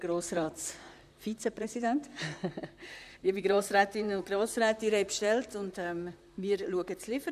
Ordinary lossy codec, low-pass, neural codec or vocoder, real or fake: none; 14.4 kHz; vocoder, 44.1 kHz, 128 mel bands, Pupu-Vocoder; fake